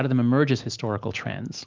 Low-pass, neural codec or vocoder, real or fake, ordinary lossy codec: 7.2 kHz; none; real; Opus, 32 kbps